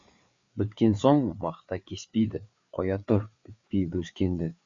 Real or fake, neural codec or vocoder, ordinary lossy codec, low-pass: fake; codec, 16 kHz, 4 kbps, FreqCodec, larger model; Opus, 64 kbps; 7.2 kHz